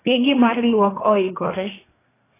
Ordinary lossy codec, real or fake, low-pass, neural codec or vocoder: AAC, 16 kbps; fake; 3.6 kHz; codec, 24 kHz, 1 kbps, SNAC